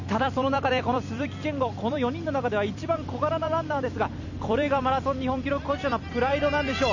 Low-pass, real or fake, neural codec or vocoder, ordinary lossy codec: 7.2 kHz; fake; vocoder, 44.1 kHz, 128 mel bands every 256 samples, BigVGAN v2; none